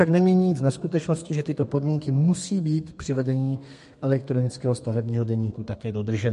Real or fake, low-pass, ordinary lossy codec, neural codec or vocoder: fake; 14.4 kHz; MP3, 48 kbps; codec, 32 kHz, 1.9 kbps, SNAC